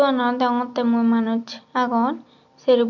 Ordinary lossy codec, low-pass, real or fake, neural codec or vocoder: none; 7.2 kHz; real; none